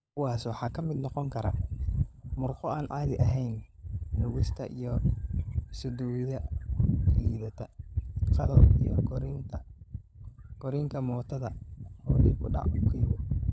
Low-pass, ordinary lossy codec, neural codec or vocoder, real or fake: none; none; codec, 16 kHz, 16 kbps, FunCodec, trained on LibriTTS, 50 frames a second; fake